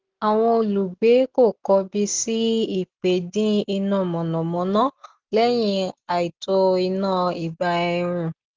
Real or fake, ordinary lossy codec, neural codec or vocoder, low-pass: real; Opus, 16 kbps; none; 7.2 kHz